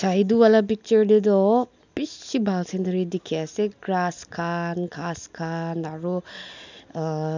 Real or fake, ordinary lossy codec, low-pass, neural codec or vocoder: real; none; 7.2 kHz; none